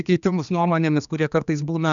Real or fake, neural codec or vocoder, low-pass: fake; codec, 16 kHz, 2 kbps, X-Codec, HuBERT features, trained on general audio; 7.2 kHz